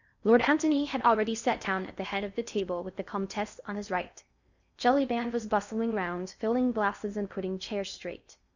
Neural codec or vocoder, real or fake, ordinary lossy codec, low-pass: codec, 16 kHz in and 24 kHz out, 0.6 kbps, FocalCodec, streaming, 4096 codes; fake; Opus, 64 kbps; 7.2 kHz